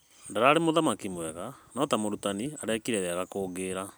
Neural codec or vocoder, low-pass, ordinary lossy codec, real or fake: none; none; none; real